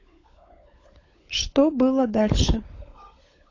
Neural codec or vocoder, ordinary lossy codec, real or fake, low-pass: codec, 16 kHz, 16 kbps, FunCodec, trained on LibriTTS, 50 frames a second; AAC, 32 kbps; fake; 7.2 kHz